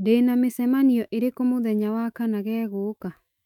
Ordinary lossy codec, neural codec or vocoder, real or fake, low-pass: none; autoencoder, 48 kHz, 128 numbers a frame, DAC-VAE, trained on Japanese speech; fake; 19.8 kHz